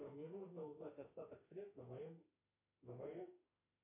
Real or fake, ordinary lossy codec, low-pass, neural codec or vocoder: fake; MP3, 24 kbps; 3.6 kHz; autoencoder, 48 kHz, 32 numbers a frame, DAC-VAE, trained on Japanese speech